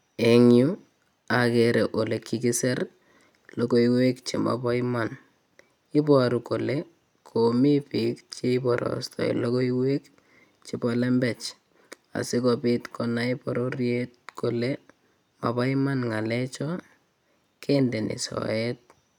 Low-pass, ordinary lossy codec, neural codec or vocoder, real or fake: 19.8 kHz; none; none; real